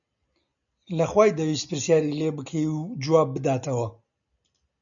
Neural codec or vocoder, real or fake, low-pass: none; real; 7.2 kHz